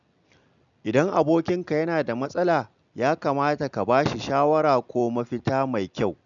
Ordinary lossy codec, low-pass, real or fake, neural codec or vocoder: none; 7.2 kHz; real; none